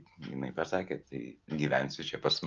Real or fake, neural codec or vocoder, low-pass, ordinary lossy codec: real; none; 7.2 kHz; Opus, 24 kbps